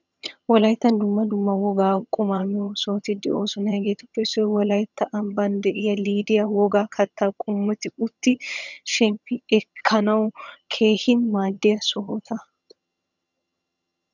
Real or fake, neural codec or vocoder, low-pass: fake; vocoder, 22.05 kHz, 80 mel bands, HiFi-GAN; 7.2 kHz